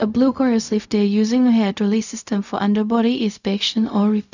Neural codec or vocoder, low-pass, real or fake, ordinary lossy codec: codec, 16 kHz, 0.4 kbps, LongCat-Audio-Codec; 7.2 kHz; fake; none